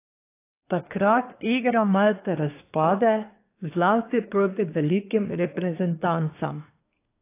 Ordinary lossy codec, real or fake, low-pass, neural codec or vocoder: AAC, 24 kbps; fake; 3.6 kHz; codec, 24 kHz, 1 kbps, SNAC